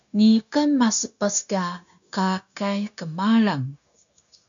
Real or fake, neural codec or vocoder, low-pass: fake; codec, 16 kHz, 0.9 kbps, LongCat-Audio-Codec; 7.2 kHz